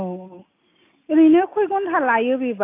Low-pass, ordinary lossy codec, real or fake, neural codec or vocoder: 3.6 kHz; AAC, 24 kbps; real; none